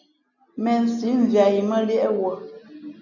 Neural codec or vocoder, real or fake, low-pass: none; real; 7.2 kHz